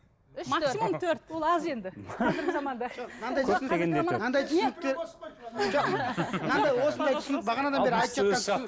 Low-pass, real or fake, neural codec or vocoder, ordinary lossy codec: none; real; none; none